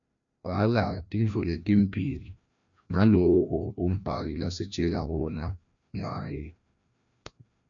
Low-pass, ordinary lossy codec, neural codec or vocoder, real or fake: 7.2 kHz; MP3, 64 kbps; codec, 16 kHz, 1 kbps, FreqCodec, larger model; fake